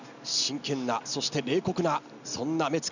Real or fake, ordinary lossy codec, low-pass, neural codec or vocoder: real; none; 7.2 kHz; none